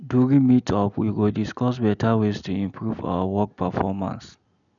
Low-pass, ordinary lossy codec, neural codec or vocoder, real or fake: 7.2 kHz; none; none; real